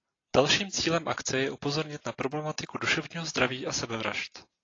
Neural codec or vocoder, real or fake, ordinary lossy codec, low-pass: none; real; AAC, 32 kbps; 7.2 kHz